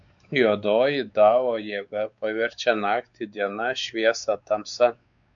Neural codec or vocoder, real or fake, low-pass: codec, 16 kHz, 4 kbps, X-Codec, WavLM features, trained on Multilingual LibriSpeech; fake; 7.2 kHz